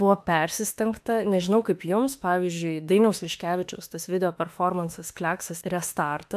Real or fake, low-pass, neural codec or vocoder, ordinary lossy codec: fake; 14.4 kHz; autoencoder, 48 kHz, 32 numbers a frame, DAC-VAE, trained on Japanese speech; AAC, 96 kbps